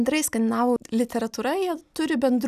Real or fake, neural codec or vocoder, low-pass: real; none; 14.4 kHz